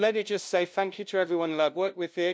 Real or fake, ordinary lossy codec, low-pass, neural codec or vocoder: fake; none; none; codec, 16 kHz, 0.5 kbps, FunCodec, trained on LibriTTS, 25 frames a second